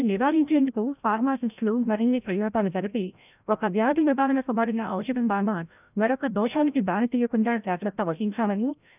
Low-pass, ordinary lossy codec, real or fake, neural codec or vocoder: 3.6 kHz; none; fake; codec, 16 kHz, 0.5 kbps, FreqCodec, larger model